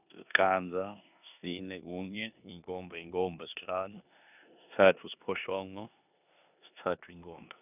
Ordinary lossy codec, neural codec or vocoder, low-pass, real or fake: none; codec, 16 kHz in and 24 kHz out, 0.9 kbps, LongCat-Audio-Codec, four codebook decoder; 3.6 kHz; fake